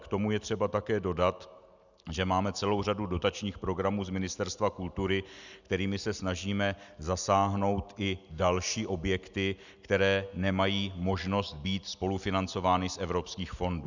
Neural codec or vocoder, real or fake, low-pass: none; real; 7.2 kHz